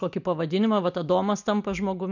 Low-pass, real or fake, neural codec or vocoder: 7.2 kHz; real; none